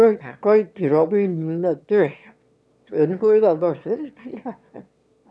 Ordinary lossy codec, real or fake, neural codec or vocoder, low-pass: none; fake; autoencoder, 22.05 kHz, a latent of 192 numbers a frame, VITS, trained on one speaker; none